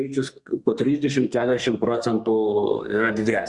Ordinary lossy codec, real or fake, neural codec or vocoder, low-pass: Opus, 64 kbps; fake; codec, 32 kHz, 1.9 kbps, SNAC; 10.8 kHz